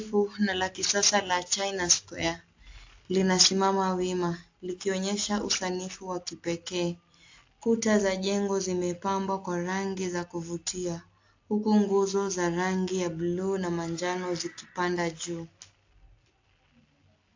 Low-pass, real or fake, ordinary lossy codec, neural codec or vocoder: 7.2 kHz; real; AAC, 48 kbps; none